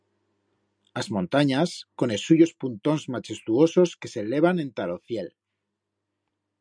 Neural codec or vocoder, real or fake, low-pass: none; real; 9.9 kHz